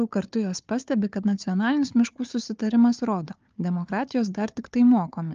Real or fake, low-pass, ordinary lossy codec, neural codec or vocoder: fake; 7.2 kHz; Opus, 24 kbps; codec, 16 kHz, 4 kbps, FunCodec, trained on Chinese and English, 50 frames a second